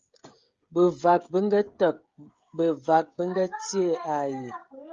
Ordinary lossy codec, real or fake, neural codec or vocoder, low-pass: Opus, 32 kbps; fake; codec, 16 kHz, 16 kbps, FreqCodec, smaller model; 7.2 kHz